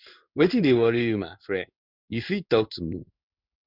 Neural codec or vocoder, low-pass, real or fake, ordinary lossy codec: codec, 16 kHz in and 24 kHz out, 1 kbps, XY-Tokenizer; 5.4 kHz; fake; none